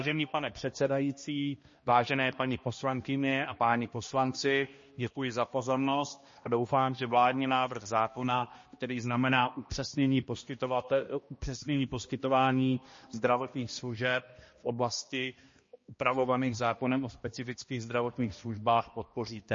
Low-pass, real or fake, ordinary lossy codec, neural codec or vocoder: 7.2 kHz; fake; MP3, 32 kbps; codec, 16 kHz, 1 kbps, X-Codec, HuBERT features, trained on balanced general audio